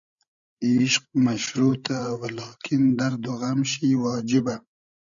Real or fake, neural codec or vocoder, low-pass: fake; codec, 16 kHz, 16 kbps, FreqCodec, larger model; 7.2 kHz